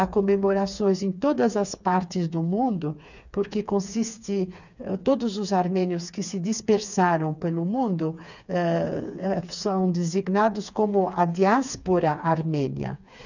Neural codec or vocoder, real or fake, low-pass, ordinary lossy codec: codec, 16 kHz, 4 kbps, FreqCodec, smaller model; fake; 7.2 kHz; none